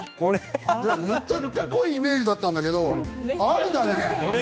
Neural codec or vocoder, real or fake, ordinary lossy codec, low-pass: codec, 16 kHz, 2 kbps, X-Codec, HuBERT features, trained on general audio; fake; none; none